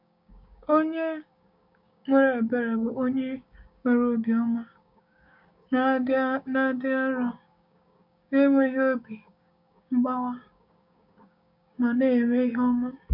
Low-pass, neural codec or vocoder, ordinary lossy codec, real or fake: 5.4 kHz; codec, 16 kHz, 6 kbps, DAC; MP3, 48 kbps; fake